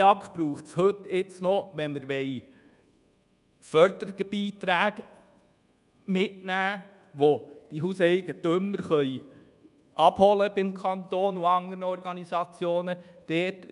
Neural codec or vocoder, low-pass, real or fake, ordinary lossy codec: codec, 24 kHz, 1.2 kbps, DualCodec; 10.8 kHz; fake; none